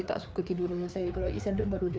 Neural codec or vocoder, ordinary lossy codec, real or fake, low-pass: codec, 16 kHz, 4 kbps, FreqCodec, larger model; none; fake; none